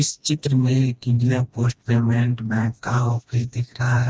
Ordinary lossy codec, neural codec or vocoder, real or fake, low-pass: none; codec, 16 kHz, 1 kbps, FreqCodec, smaller model; fake; none